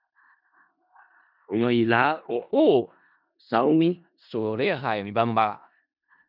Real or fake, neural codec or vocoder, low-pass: fake; codec, 16 kHz in and 24 kHz out, 0.4 kbps, LongCat-Audio-Codec, four codebook decoder; 5.4 kHz